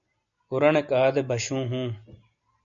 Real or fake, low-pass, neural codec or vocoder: real; 7.2 kHz; none